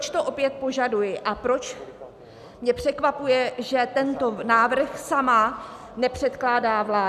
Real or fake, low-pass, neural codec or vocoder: real; 14.4 kHz; none